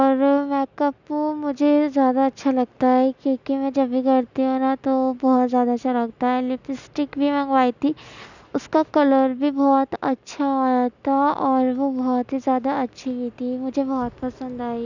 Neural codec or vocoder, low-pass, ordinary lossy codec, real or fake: none; 7.2 kHz; none; real